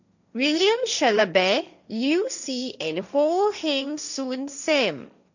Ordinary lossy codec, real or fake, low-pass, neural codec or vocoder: none; fake; 7.2 kHz; codec, 16 kHz, 1.1 kbps, Voila-Tokenizer